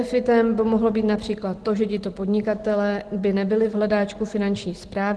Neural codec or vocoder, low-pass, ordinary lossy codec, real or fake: none; 10.8 kHz; Opus, 16 kbps; real